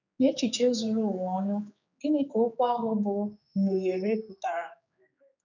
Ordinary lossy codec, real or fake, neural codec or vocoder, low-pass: none; fake; codec, 16 kHz, 4 kbps, X-Codec, HuBERT features, trained on general audio; 7.2 kHz